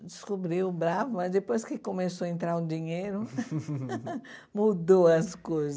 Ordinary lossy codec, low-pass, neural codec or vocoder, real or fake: none; none; none; real